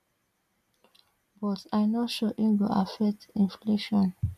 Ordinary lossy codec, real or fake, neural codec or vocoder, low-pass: none; real; none; 14.4 kHz